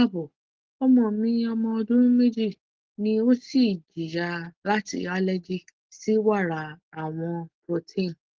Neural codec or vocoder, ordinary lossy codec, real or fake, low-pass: none; Opus, 16 kbps; real; 7.2 kHz